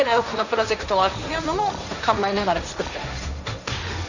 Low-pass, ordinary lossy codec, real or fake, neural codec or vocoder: 7.2 kHz; none; fake; codec, 16 kHz, 1.1 kbps, Voila-Tokenizer